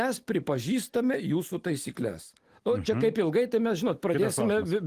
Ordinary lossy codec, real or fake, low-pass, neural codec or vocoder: Opus, 24 kbps; real; 14.4 kHz; none